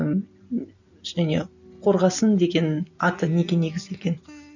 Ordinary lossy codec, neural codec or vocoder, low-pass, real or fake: none; none; 7.2 kHz; real